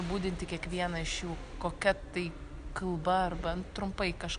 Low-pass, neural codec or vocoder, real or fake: 9.9 kHz; none; real